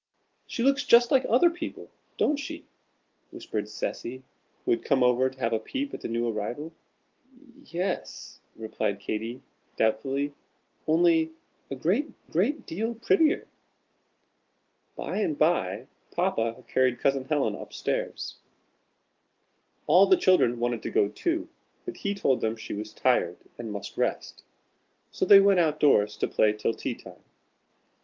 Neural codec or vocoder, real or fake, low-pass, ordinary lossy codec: none; real; 7.2 kHz; Opus, 32 kbps